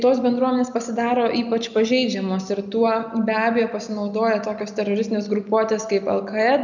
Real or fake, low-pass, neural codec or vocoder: real; 7.2 kHz; none